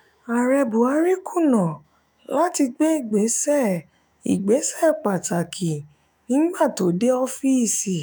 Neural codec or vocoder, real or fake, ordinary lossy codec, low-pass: autoencoder, 48 kHz, 128 numbers a frame, DAC-VAE, trained on Japanese speech; fake; none; none